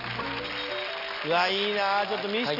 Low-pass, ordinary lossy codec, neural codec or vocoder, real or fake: 5.4 kHz; none; none; real